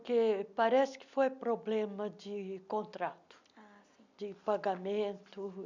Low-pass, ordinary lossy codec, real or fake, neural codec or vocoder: 7.2 kHz; none; real; none